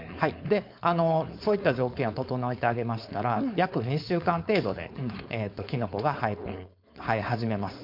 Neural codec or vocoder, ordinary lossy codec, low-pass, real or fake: codec, 16 kHz, 4.8 kbps, FACodec; MP3, 48 kbps; 5.4 kHz; fake